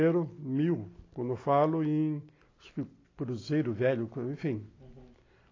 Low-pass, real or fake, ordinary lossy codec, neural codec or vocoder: 7.2 kHz; real; AAC, 32 kbps; none